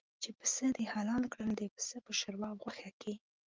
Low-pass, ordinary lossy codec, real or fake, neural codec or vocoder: 7.2 kHz; Opus, 24 kbps; real; none